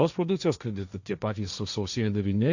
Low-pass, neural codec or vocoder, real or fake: 7.2 kHz; codec, 16 kHz, 1.1 kbps, Voila-Tokenizer; fake